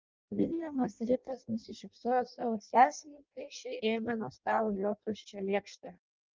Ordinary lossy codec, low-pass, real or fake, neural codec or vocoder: Opus, 24 kbps; 7.2 kHz; fake; codec, 16 kHz in and 24 kHz out, 0.6 kbps, FireRedTTS-2 codec